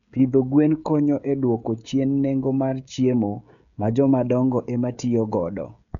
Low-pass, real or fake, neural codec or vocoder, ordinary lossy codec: 7.2 kHz; fake; codec, 16 kHz, 16 kbps, FreqCodec, smaller model; none